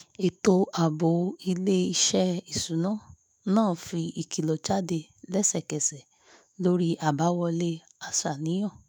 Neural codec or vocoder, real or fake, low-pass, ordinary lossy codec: autoencoder, 48 kHz, 128 numbers a frame, DAC-VAE, trained on Japanese speech; fake; none; none